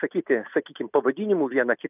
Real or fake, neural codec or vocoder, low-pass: real; none; 3.6 kHz